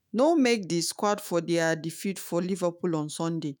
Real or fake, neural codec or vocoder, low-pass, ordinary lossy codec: fake; autoencoder, 48 kHz, 128 numbers a frame, DAC-VAE, trained on Japanese speech; none; none